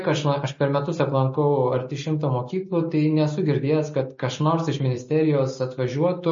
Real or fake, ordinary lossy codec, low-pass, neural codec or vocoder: real; MP3, 32 kbps; 7.2 kHz; none